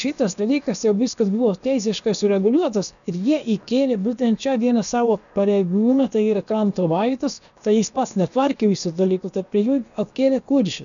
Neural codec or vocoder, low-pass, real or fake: codec, 16 kHz, about 1 kbps, DyCAST, with the encoder's durations; 7.2 kHz; fake